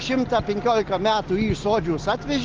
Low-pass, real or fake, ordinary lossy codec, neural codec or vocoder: 7.2 kHz; real; Opus, 16 kbps; none